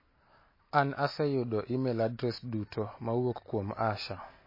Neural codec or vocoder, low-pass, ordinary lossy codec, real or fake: none; 5.4 kHz; MP3, 24 kbps; real